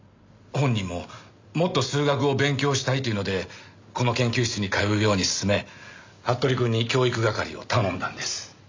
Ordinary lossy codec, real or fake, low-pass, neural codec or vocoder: MP3, 64 kbps; real; 7.2 kHz; none